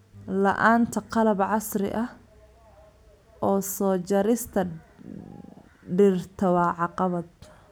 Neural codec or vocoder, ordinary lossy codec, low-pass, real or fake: none; none; none; real